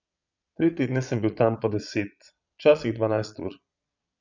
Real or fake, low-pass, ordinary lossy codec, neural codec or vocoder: fake; 7.2 kHz; none; vocoder, 22.05 kHz, 80 mel bands, Vocos